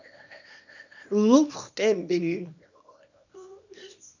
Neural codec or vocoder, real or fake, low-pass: codec, 24 kHz, 0.9 kbps, WavTokenizer, small release; fake; 7.2 kHz